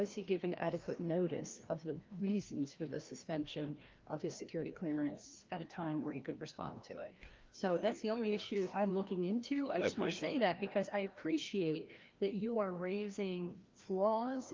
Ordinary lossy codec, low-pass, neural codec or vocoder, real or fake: Opus, 32 kbps; 7.2 kHz; codec, 16 kHz, 1 kbps, FreqCodec, larger model; fake